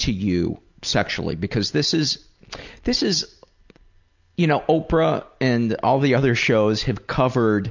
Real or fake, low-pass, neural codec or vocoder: real; 7.2 kHz; none